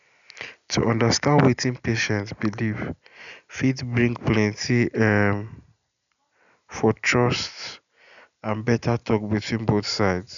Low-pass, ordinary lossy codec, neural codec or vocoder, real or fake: 7.2 kHz; none; none; real